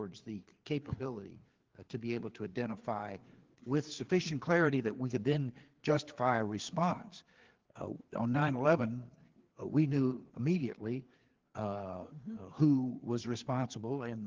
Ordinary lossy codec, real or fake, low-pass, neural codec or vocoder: Opus, 16 kbps; fake; 7.2 kHz; codec, 16 kHz, 4 kbps, FreqCodec, larger model